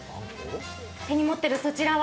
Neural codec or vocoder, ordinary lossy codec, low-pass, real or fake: none; none; none; real